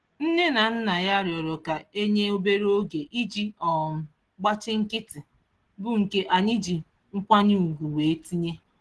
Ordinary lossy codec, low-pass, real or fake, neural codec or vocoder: Opus, 16 kbps; 10.8 kHz; real; none